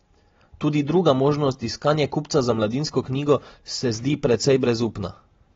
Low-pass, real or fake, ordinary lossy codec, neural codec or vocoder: 7.2 kHz; real; AAC, 24 kbps; none